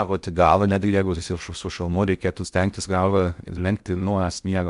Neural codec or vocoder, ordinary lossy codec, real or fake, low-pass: codec, 16 kHz in and 24 kHz out, 0.6 kbps, FocalCodec, streaming, 4096 codes; AAC, 96 kbps; fake; 10.8 kHz